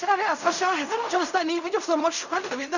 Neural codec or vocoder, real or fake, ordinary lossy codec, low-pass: codec, 16 kHz in and 24 kHz out, 0.4 kbps, LongCat-Audio-Codec, fine tuned four codebook decoder; fake; none; 7.2 kHz